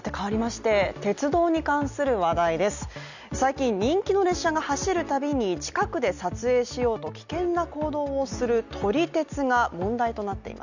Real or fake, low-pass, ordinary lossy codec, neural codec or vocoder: real; 7.2 kHz; none; none